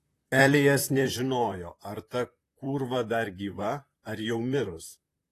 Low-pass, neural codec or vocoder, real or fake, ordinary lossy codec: 14.4 kHz; vocoder, 44.1 kHz, 128 mel bands, Pupu-Vocoder; fake; AAC, 48 kbps